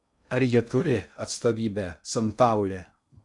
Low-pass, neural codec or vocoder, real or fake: 10.8 kHz; codec, 16 kHz in and 24 kHz out, 0.6 kbps, FocalCodec, streaming, 2048 codes; fake